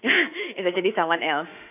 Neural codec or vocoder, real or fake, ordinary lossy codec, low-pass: autoencoder, 48 kHz, 32 numbers a frame, DAC-VAE, trained on Japanese speech; fake; none; 3.6 kHz